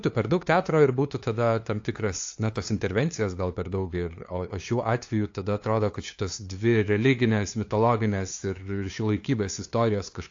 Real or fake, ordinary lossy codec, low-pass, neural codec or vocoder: fake; AAC, 48 kbps; 7.2 kHz; codec, 16 kHz, 2 kbps, FunCodec, trained on LibriTTS, 25 frames a second